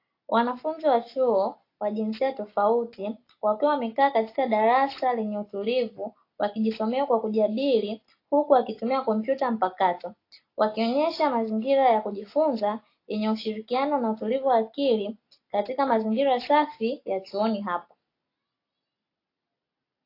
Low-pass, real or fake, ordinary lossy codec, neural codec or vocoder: 5.4 kHz; real; AAC, 32 kbps; none